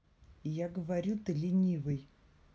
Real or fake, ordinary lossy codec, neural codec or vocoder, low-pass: real; none; none; none